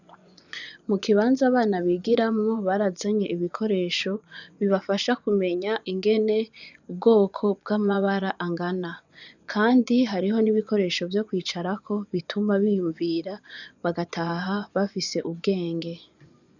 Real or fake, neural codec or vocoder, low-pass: fake; vocoder, 24 kHz, 100 mel bands, Vocos; 7.2 kHz